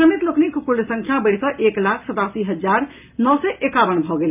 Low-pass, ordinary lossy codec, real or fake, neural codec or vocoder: 3.6 kHz; AAC, 32 kbps; real; none